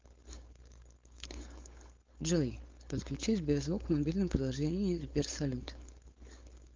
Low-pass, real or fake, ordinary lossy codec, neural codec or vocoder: 7.2 kHz; fake; Opus, 32 kbps; codec, 16 kHz, 4.8 kbps, FACodec